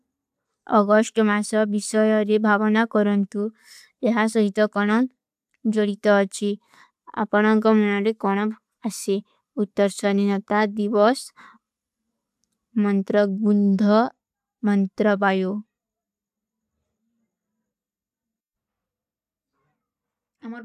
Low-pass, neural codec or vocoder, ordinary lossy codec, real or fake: 14.4 kHz; none; AAC, 96 kbps; real